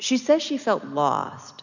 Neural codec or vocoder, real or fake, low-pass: none; real; 7.2 kHz